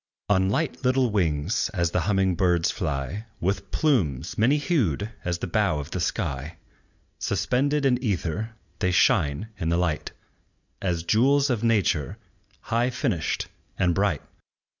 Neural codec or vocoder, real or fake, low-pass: none; real; 7.2 kHz